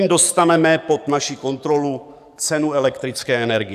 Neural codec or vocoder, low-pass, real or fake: codec, 44.1 kHz, 7.8 kbps, DAC; 14.4 kHz; fake